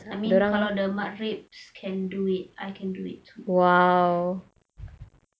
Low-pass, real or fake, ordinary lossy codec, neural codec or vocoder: none; real; none; none